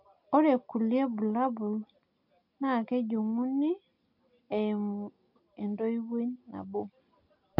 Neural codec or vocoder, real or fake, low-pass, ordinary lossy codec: none; real; 5.4 kHz; MP3, 48 kbps